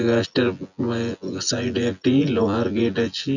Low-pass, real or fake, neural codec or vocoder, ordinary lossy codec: 7.2 kHz; fake; vocoder, 24 kHz, 100 mel bands, Vocos; none